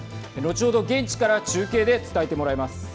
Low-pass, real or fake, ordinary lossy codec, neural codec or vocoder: none; real; none; none